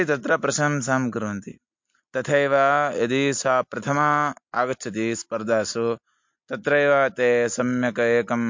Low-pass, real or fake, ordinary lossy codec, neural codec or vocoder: 7.2 kHz; real; MP3, 48 kbps; none